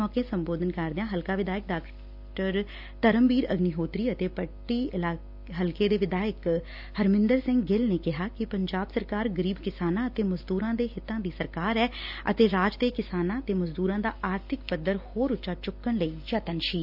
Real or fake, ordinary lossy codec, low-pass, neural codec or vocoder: real; AAC, 48 kbps; 5.4 kHz; none